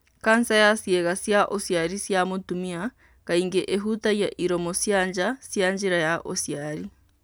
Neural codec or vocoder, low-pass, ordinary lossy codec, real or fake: none; none; none; real